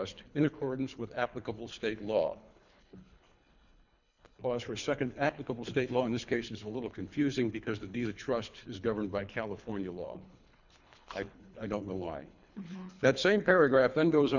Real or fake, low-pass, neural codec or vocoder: fake; 7.2 kHz; codec, 24 kHz, 3 kbps, HILCodec